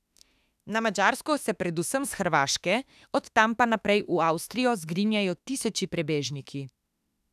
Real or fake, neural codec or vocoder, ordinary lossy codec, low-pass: fake; autoencoder, 48 kHz, 32 numbers a frame, DAC-VAE, trained on Japanese speech; none; 14.4 kHz